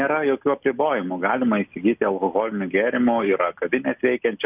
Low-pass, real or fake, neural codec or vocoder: 3.6 kHz; real; none